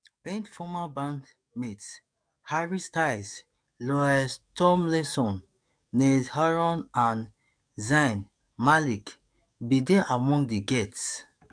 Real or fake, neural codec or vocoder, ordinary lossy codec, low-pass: fake; codec, 44.1 kHz, 7.8 kbps, DAC; none; 9.9 kHz